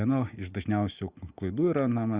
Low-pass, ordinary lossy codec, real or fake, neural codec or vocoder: 3.6 kHz; Opus, 64 kbps; real; none